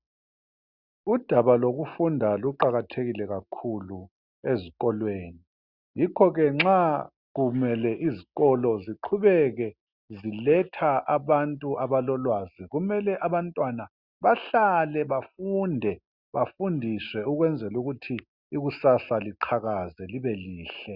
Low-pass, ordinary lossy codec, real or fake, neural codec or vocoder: 5.4 kHz; Opus, 64 kbps; real; none